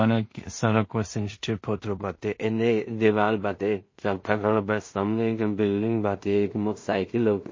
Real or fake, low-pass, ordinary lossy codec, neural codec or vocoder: fake; 7.2 kHz; MP3, 32 kbps; codec, 16 kHz in and 24 kHz out, 0.4 kbps, LongCat-Audio-Codec, two codebook decoder